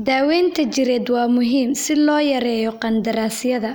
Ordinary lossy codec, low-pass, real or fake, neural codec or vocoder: none; none; real; none